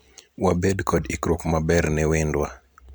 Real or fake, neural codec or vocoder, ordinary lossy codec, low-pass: real; none; none; none